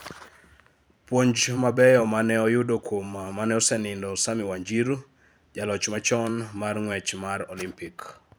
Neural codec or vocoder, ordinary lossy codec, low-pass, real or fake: vocoder, 44.1 kHz, 128 mel bands every 512 samples, BigVGAN v2; none; none; fake